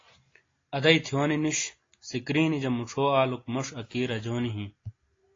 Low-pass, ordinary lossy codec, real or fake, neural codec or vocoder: 7.2 kHz; AAC, 32 kbps; real; none